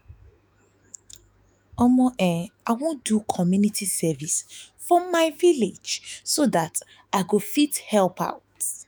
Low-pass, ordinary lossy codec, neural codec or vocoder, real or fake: none; none; autoencoder, 48 kHz, 128 numbers a frame, DAC-VAE, trained on Japanese speech; fake